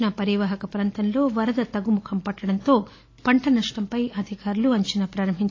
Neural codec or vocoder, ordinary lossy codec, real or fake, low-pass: none; AAC, 32 kbps; real; 7.2 kHz